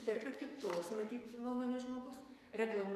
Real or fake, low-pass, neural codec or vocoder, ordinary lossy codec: fake; 14.4 kHz; codec, 44.1 kHz, 2.6 kbps, SNAC; MP3, 96 kbps